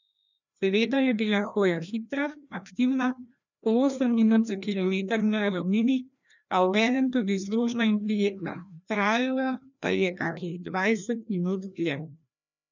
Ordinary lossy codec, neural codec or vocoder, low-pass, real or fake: none; codec, 16 kHz, 1 kbps, FreqCodec, larger model; 7.2 kHz; fake